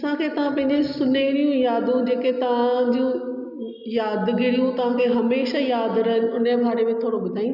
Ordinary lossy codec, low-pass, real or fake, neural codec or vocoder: none; 5.4 kHz; real; none